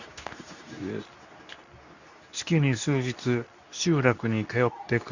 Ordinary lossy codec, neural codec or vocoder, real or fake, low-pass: none; codec, 24 kHz, 0.9 kbps, WavTokenizer, medium speech release version 2; fake; 7.2 kHz